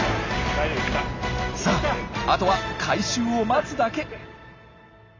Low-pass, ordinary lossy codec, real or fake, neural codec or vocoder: 7.2 kHz; MP3, 64 kbps; real; none